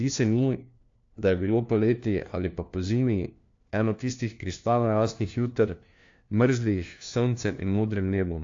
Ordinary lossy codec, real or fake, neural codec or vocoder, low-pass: AAC, 48 kbps; fake; codec, 16 kHz, 1 kbps, FunCodec, trained on LibriTTS, 50 frames a second; 7.2 kHz